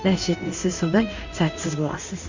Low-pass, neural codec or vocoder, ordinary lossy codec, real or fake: 7.2 kHz; codec, 16 kHz in and 24 kHz out, 1 kbps, XY-Tokenizer; Opus, 64 kbps; fake